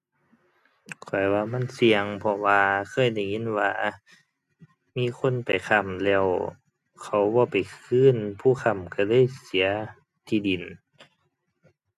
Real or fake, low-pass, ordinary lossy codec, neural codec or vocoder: real; 14.4 kHz; none; none